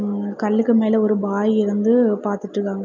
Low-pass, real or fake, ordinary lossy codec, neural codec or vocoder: 7.2 kHz; real; none; none